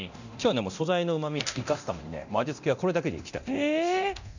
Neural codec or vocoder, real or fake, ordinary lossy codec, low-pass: codec, 24 kHz, 0.9 kbps, DualCodec; fake; none; 7.2 kHz